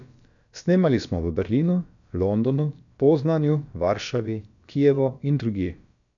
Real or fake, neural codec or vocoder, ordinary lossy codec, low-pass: fake; codec, 16 kHz, about 1 kbps, DyCAST, with the encoder's durations; MP3, 96 kbps; 7.2 kHz